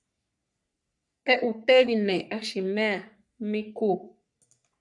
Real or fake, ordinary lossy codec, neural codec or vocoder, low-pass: fake; MP3, 96 kbps; codec, 44.1 kHz, 3.4 kbps, Pupu-Codec; 10.8 kHz